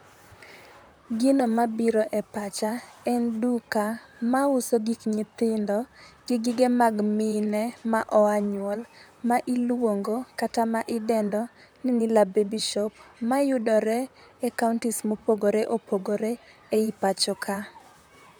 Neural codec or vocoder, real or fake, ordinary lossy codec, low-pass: vocoder, 44.1 kHz, 128 mel bands, Pupu-Vocoder; fake; none; none